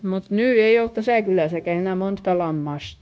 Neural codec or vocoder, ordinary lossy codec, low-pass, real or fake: codec, 16 kHz, 0.9 kbps, LongCat-Audio-Codec; none; none; fake